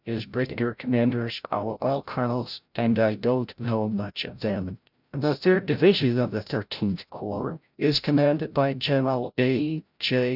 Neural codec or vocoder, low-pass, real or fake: codec, 16 kHz, 0.5 kbps, FreqCodec, larger model; 5.4 kHz; fake